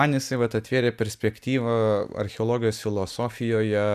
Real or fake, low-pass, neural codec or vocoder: real; 14.4 kHz; none